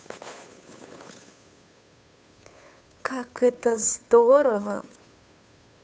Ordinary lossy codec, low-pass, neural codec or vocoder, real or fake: none; none; codec, 16 kHz, 2 kbps, FunCodec, trained on Chinese and English, 25 frames a second; fake